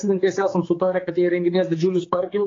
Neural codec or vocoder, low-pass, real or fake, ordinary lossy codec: codec, 16 kHz, 4 kbps, X-Codec, HuBERT features, trained on general audio; 7.2 kHz; fake; AAC, 32 kbps